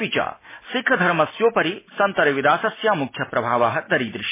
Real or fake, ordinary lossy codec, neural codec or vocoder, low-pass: real; MP3, 16 kbps; none; 3.6 kHz